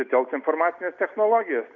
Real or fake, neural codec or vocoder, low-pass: real; none; 7.2 kHz